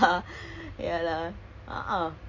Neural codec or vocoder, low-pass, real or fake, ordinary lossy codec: none; 7.2 kHz; real; MP3, 48 kbps